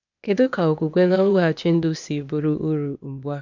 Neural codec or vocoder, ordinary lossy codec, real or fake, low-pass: codec, 16 kHz, 0.8 kbps, ZipCodec; none; fake; 7.2 kHz